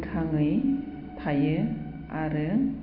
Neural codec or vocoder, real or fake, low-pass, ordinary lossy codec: none; real; 5.4 kHz; none